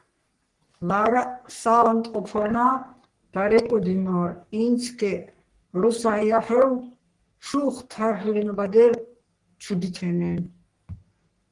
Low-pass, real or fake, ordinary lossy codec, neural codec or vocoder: 10.8 kHz; fake; Opus, 24 kbps; codec, 44.1 kHz, 3.4 kbps, Pupu-Codec